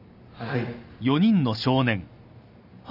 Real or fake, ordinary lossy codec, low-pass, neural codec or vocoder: real; none; 5.4 kHz; none